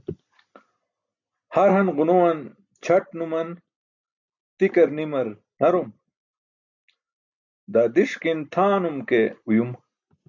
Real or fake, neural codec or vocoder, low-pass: real; none; 7.2 kHz